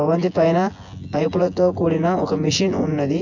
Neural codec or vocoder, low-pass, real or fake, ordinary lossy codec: vocoder, 24 kHz, 100 mel bands, Vocos; 7.2 kHz; fake; none